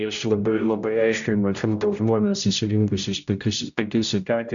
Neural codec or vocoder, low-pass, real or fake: codec, 16 kHz, 0.5 kbps, X-Codec, HuBERT features, trained on general audio; 7.2 kHz; fake